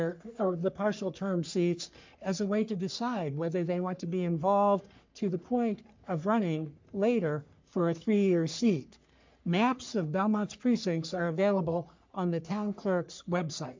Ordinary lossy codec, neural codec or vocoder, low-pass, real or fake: MP3, 64 kbps; codec, 44.1 kHz, 3.4 kbps, Pupu-Codec; 7.2 kHz; fake